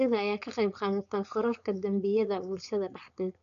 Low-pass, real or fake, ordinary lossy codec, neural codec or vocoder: 7.2 kHz; fake; none; codec, 16 kHz, 4.8 kbps, FACodec